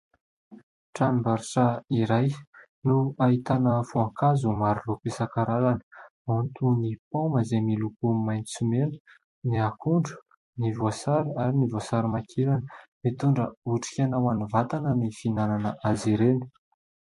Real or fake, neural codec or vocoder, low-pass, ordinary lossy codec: fake; vocoder, 44.1 kHz, 128 mel bands every 256 samples, BigVGAN v2; 14.4 kHz; MP3, 48 kbps